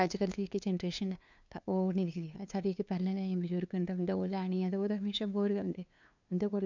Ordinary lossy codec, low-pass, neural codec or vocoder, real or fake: none; 7.2 kHz; codec, 16 kHz, 2 kbps, FunCodec, trained on LibriTTS, 25 frames a second; fake